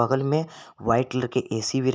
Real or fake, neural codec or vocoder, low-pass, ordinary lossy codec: real; none; none; none